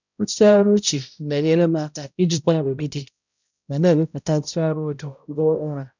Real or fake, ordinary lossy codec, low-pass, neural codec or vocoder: fake; none; 7.2 kHz; codec, 16 kHz, 0.5 kbps, X-Codec, HuBERT features, trained on balanced general audio